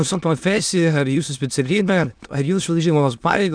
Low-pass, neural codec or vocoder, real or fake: 9.9 kHz; autoencoder, 22.05 kHz, a latent of 192 numbers a frame, VITS, trained on many speakers; fake